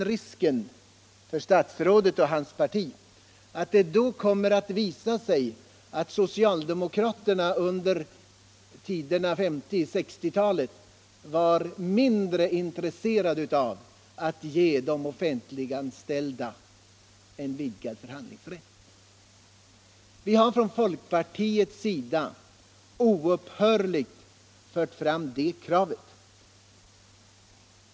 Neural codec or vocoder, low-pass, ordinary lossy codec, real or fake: none; none; none; real